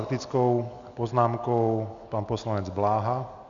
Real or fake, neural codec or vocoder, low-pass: real; none; 7.2 kHz